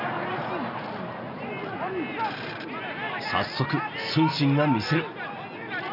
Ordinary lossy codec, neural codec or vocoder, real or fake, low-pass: none; none; real; 5.4 kHz